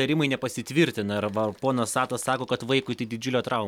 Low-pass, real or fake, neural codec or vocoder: 19.8 kHz; real; none